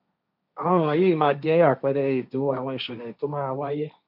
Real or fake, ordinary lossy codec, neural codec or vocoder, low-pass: fake; none; codec, 16 kHz, 1.1 kbps, Voila-Tokenizer; 5.4 kHz